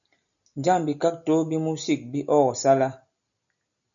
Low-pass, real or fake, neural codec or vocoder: 7.2 kHz; real; none